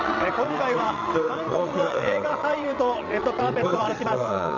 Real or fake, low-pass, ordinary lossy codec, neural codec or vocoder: fake; 7.2 kHz; none; vocoder, 22.05 kHz, 80 mel bands, WaveNeXt